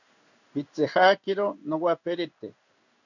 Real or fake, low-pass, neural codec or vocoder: fake; 7.2 kHz; codec, 16 kHz in and 24 kHz out, 1 kbps, XY-Tokenizer